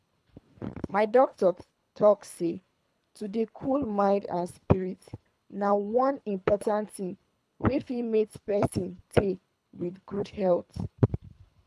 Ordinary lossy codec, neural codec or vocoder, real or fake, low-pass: none; codec, 24 kHz, 3 kbps, HILCodec; fake; none